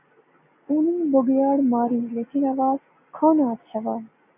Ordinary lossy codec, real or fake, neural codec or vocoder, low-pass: AAC, 32 kbps; real; none; 3.6 kHz